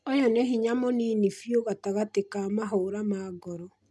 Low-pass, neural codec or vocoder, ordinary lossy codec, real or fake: none; none; none; real